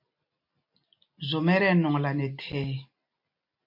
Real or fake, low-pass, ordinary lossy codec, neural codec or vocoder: real; 5.4 kHz; MP3, 32 kbps; none